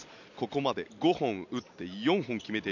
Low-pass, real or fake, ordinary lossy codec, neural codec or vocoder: 7.2 kHz; real; none; none